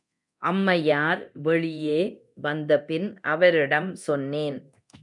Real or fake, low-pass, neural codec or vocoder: fake; 10.8 kHz; codec, 24 kHz, 0.9 kbps, DualCodec